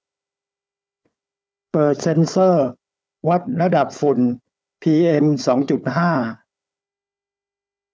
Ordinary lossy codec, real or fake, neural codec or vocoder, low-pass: none; fake; codec, 16 kHz, 4 kbps, FunCodec, trained on Chinese and English, 50 frames a second; none